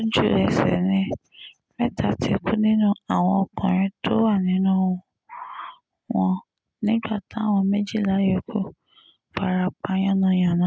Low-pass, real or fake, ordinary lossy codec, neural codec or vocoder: none; real; none; none